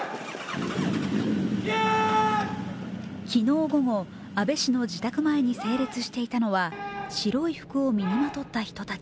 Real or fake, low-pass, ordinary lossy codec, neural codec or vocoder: real; none; none; none